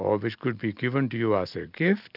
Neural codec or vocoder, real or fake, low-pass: none; real; 5.4 kHz